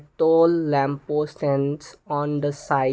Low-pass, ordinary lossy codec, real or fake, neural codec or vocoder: none; none; real; none